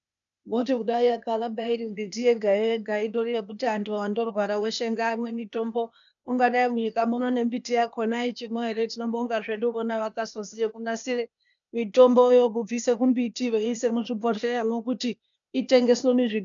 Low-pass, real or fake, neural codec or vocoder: 7.2 kHz; fake; codec, 16 kHz, 0.8 kbps, ZipCodec